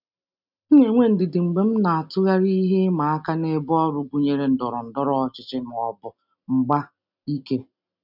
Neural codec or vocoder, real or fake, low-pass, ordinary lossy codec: none; real; 5.4 kHz; none